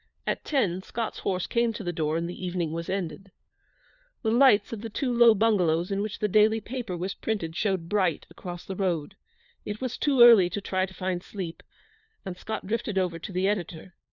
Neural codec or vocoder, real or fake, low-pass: codec, 16 kHz, 4 kbps, FunCodec, trained on LibriTTS, 50 frames a second; fake; 7.2 kHz